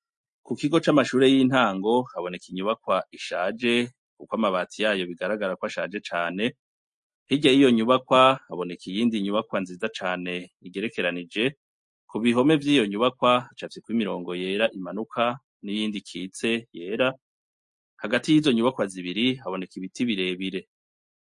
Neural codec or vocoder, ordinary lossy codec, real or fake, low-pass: none; MP3, 48 kbps; real; 10.8 kHz